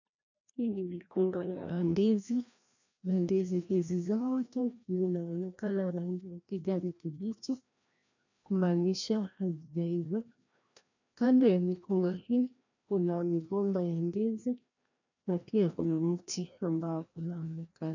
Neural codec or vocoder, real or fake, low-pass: codec, 16 kHz, 1 kbps, FreqCodec, larger model; fake; 7.2 kHz